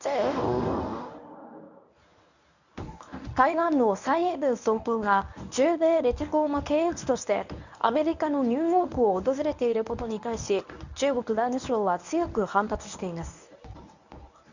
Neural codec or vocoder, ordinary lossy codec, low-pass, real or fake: codec, 24 kHz, 0.9 kbps, WavTokenizer, medium speech release version 1; none; 7.2 kHz; fake